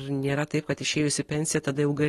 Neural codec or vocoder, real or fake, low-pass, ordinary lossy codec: none; real; 19.8 kHz; AAC, 32 kbps